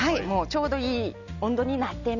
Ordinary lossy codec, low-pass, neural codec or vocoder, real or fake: none; 7.2 kHz; none; real